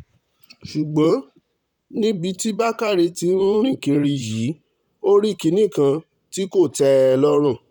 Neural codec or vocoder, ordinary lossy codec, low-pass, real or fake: vocoder, 44.1 kHz, 128 mel bands, Pupu-Vocoder; none; 19.8 kHz; fake